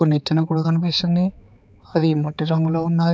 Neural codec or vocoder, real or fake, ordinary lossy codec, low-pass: codec, 16 kHz, 4 kbps, X-Codec, HuBERT features, trained on balanced general audio; fake; none; none